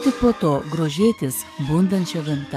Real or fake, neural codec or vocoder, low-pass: fake; codec, 44.1 kHz, 7.8 kbps, DAC; 14.4 kHz